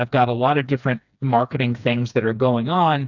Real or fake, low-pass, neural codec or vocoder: fake; 7.2 kHz; codec, 16 kHz, 2 kbps, FreqCodec, smaller model